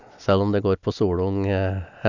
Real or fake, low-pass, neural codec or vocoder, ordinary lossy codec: real; 7.2 kHz; none; none